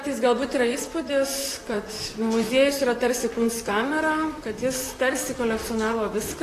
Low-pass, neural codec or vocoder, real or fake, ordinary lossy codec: 14.4 kHz; vocoder, 44.1 kHz, 128 mel bands, Pupu-Vocoder; fake; AAC, 48 kbps